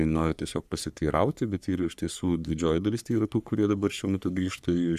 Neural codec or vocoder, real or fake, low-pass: codec, 44.1 kHz, 3.4 kbps, Pupu-Codec; fake; 14.4 kHz